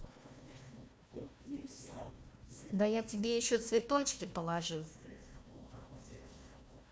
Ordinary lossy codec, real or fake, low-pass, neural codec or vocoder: none; fake; none; codec, 16 kHz, 1 kbps, FunCodec, trained on Chinese and English, 50 frames a second